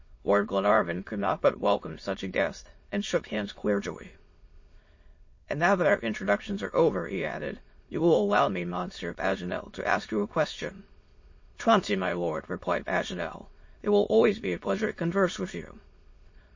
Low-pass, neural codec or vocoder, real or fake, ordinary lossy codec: 7.2 kHz; autoencoder, 22.05 kHz, a latent of 192 numbers a frame, VITS, trained on many speakers; fake; MP3, 32 kbps